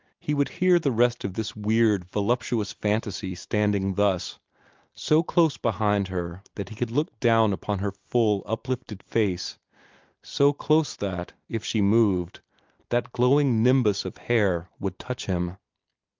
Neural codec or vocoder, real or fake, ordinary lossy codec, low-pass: none; real; Opus, 24 kbps; 7.2 kHz